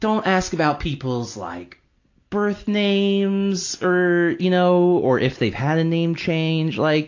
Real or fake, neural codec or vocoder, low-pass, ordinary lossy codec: real; none; 7.2 kHz; AAC, 48 kbps